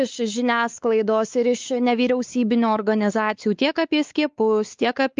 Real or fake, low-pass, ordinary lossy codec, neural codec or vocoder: fake; 7.2 kHz; Opus, 24 kbps; codec, 16 kHz, 4 kbps, X-Codec, WavLM features, trained on Multilingual LibriSpeech